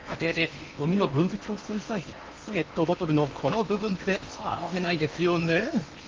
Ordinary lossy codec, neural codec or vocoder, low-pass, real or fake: Opus, 24 kbps; codec, 16 kHz in and 24 kHz out, 0.8 kbps, FocalCodec, streaming, 65536 codes; 7.2 kHz; fake